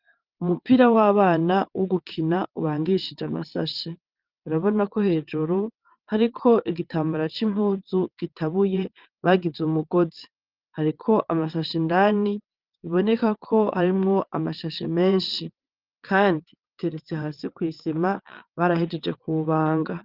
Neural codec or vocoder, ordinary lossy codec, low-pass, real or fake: vocoder, 22.05 kHz, 80 mel bands, WaveNeXt; Opus, 24 kbps; 5.4 kHz; fake